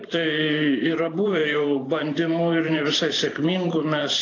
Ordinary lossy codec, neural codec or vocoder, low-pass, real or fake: AAC, 32 kbps; vocoder, 44.1 kHz, 128 mel bands every 512 samples, BigVGAN v2; 7.2 kHz; fake